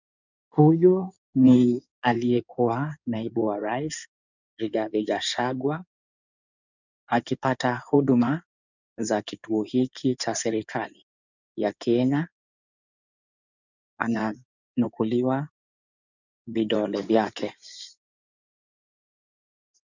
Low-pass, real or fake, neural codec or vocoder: 7.2 kHz; fake; codec, 16 kHz in and 24 kHz out, 2.2 kbps, FireRedTTS-2 codec